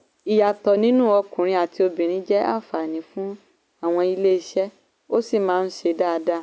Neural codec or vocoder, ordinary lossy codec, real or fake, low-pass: none; none; real; none